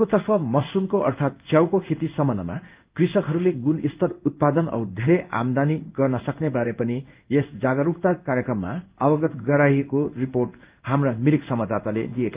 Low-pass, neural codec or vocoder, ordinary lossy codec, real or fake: 3.6 kHz; codec, 16 kHz in and 24 kHz out, 1 kbps, XY-Tokenizer; Opus, 24 kbps; fake